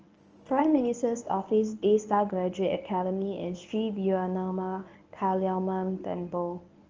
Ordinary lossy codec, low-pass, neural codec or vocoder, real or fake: Opus, 24 kbps; 7.2 kHz; codec, 24 kHz, 0.9 kbps, WavTokenizer, medium speech release version 1; fake